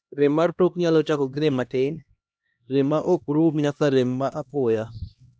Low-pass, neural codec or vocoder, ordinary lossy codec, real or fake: none; codec, 16 kHz, 1 kbps, X-Codec, HuBERT features, trained on LibriSpeech; none; fake